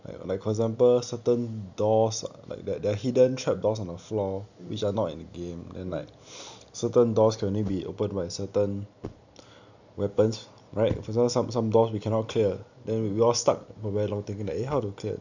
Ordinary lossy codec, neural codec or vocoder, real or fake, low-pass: none; none; real; 7.2 kHz